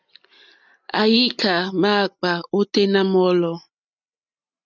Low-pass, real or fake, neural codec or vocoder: 7.2 kHz; real; none